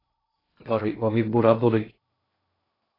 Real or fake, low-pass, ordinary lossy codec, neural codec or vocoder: fake; 5.4 kHz; AAC, 24 kbps; codec, 16 kHz in and 24 kHz out, 0.6 kbps, FocalCodec, streaming, 2048 codes